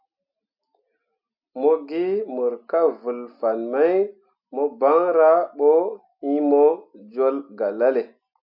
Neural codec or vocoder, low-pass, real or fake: none; 5.4 kHz; real